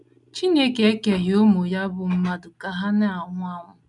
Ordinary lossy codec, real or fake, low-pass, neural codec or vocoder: none; real; 10.8 kHz; none